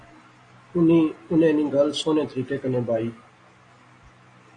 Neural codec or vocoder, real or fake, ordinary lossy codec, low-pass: none; real; AAC, 32 kbps; 9.9 kHz